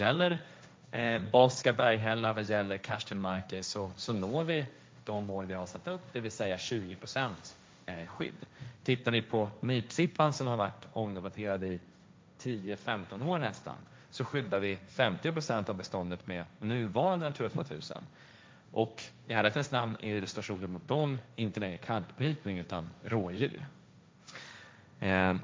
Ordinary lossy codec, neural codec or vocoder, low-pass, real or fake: none; codec, 16 kHz, 1.1 kbps, Voila-Tokenizer; none; fake